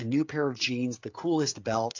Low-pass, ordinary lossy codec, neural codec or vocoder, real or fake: 7.2 kHz; AAC, 48 kbps; codec, 16 kHz, 16 kbps, FreqCodec, smaller model; fake